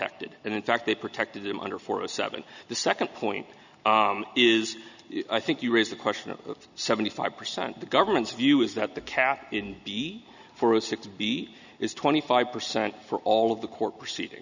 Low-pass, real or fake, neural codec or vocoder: 7.2 kHz; real; none